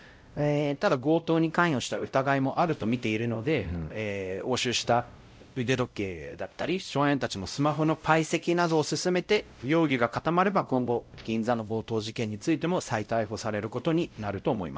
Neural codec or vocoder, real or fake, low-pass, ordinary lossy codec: codec, 16 kHz, 0.5 kbps, X-Codec, WavLM features, trained on Multilingual LibriSpeech; fake; none; none